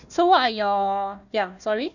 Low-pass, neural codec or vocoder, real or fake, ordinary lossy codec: 7.2 kHz; codec, 16 kHz, 1 kbps, FunCodec, trained on Chinese and English, 50 frames a second; fake; none